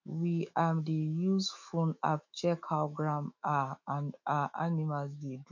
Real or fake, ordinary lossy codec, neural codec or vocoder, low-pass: fake; MP3, 48 kbps; autoencoder, 48 kHz, 128 numbers a frame, DAC-VAE, trained on Japanese speech; 7.2 kHz